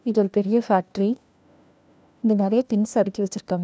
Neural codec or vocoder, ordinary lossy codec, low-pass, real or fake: codec, 16 kHz, 1 kbps, FunCodec, trained on LibriTTS, 50 frames a second; none; none; fake